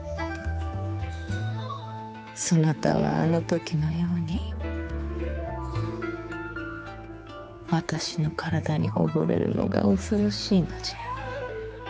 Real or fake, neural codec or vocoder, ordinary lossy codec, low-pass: fake; codec, 16 kHz, 4 kbps, X-Codec, HuBERT features, trained on general audio; none; none